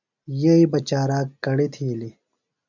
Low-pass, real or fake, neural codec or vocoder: 7.2 kHz; real; none